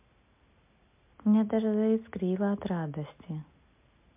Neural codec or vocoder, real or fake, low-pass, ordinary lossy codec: none; real; 3.6 kHz; MP3, 32 kbps